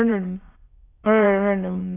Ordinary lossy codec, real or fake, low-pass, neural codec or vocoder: AAC, 16 kbps; fake; 3.6 kHz; autoencoder, 22.05 kHz, a latent of 192 numbers a frame, VITS, trained on many speakers